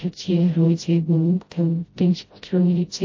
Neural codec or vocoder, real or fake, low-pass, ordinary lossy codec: codec, 16 kHz, 0.5 kbps, FreqCodec, smaller model; fake; 7.2 kHz; MP3, 32 kbps